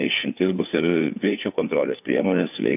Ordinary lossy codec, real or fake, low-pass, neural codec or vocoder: AAC, 32 kbps; fake; 3.6 kHz; codec, 16 kHz, 4 kbps, FreqCodec, larger model